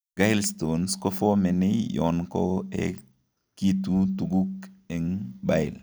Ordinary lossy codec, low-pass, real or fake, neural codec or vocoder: none; none; real; none